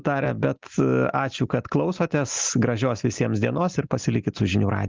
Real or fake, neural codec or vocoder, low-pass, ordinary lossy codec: real; none; 7.2 kHz; Opus, 32 kbps